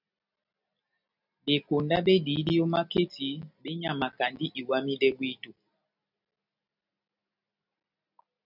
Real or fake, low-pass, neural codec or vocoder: real; 5.4 kHz; none